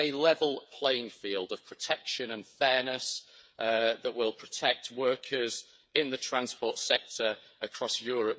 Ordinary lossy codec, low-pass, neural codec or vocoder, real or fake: none; none; codec, 16 kHz, 8 kbps, FreqCodec, smaller model; fake